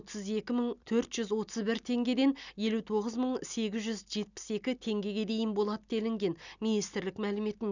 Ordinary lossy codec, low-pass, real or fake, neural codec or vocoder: none; 7.2 kHz; real; none